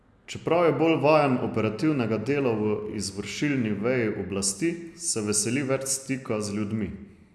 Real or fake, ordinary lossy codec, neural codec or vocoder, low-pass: real; none; none; none